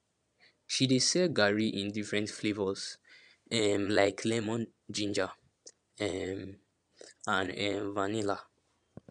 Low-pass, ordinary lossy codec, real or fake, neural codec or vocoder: 9.9 kHz; none; fake; vocoder, 22.05 kHz, 80 mel bands, Vocos